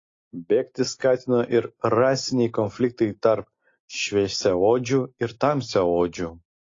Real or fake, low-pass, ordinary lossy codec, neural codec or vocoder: real; 7.2 kHz; AAC, 32 kbps; none